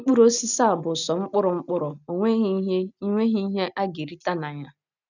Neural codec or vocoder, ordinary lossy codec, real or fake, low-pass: none; none; real; 7.2 kHz